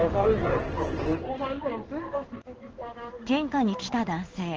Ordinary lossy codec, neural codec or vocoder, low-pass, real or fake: Opus, 16 kbps; codec, 16 kHz in and 24 kHz out, 1 kbps, XY-Tokenizer; 7.2 kHz; fake